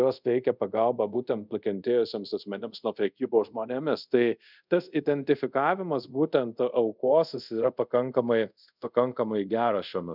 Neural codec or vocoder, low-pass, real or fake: codec, 24 kHz, 0.5 kbps, DualCodec; 5.4 kHz; fake